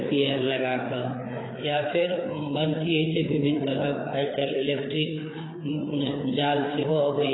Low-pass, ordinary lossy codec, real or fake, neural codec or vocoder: 7.2 kHz; AAC, 16 kbps; fake; codec, 16 kHz, 4 kbps, FreqCodec, larger model